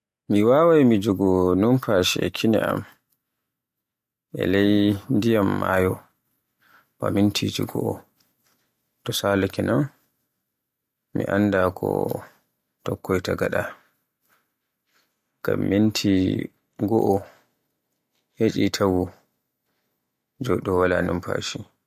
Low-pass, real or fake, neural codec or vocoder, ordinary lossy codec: 14.4 kHz; real; none; MP3, 64 kbps